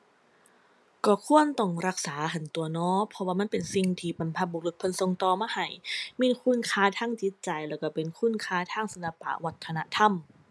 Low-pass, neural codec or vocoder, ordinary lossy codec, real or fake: none; none; none; real